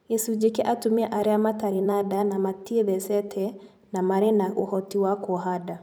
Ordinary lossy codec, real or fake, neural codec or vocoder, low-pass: none; fake; vocoder, 44.1 kHz, 128 mel bands every 512 samples, BigVGAN v2; none